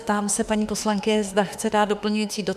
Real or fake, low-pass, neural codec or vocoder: fake; 14.4 kHz; autoencoder, 48 kHz, 32 numbers a frame, DAC-VAE, trained on Japanese speech